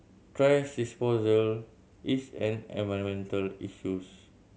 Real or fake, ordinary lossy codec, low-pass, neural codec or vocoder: real; none; none; none